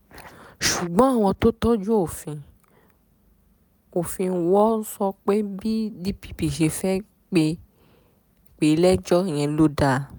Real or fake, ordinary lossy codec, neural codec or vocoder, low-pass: real; none; none; none